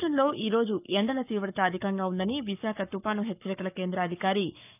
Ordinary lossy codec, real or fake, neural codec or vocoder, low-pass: none; fake; codec, 44.1 kHz, 7.8 kbps, Pupu-Codec; 3.6 kHz